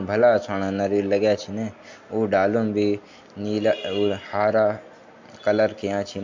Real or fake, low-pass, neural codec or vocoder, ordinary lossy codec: real; 7.2 kHz; none; MP3, 48 kbps